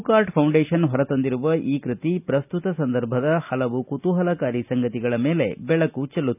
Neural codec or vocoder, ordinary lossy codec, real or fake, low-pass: none; none; real; 3.6 kHz